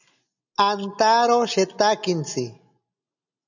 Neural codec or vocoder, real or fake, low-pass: none; real; 7.2 kHz